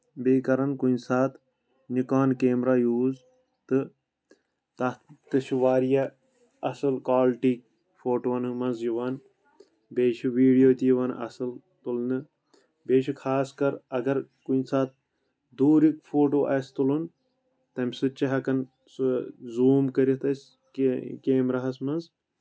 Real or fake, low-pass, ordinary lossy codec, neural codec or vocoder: real; none; none; none